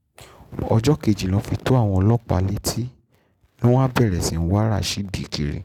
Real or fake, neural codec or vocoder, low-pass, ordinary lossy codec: real; none; 19.8 kHz; none